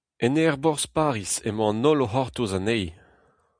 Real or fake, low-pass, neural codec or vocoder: real; 9.9 kHz; none